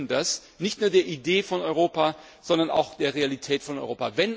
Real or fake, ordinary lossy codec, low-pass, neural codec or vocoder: real; none; none; none